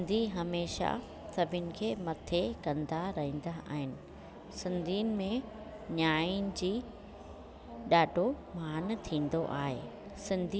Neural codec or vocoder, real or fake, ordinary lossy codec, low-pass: none; real; none; none